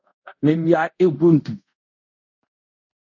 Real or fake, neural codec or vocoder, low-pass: fake; codec, 24 kHz, 0.5 kbps, DualCodec; 7.2 kHz